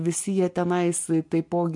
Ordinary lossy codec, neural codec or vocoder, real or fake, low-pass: MP3, 64 kbps; none; real; 10.8 kHz